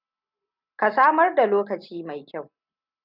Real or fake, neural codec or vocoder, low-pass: real; none; 5.4 kHz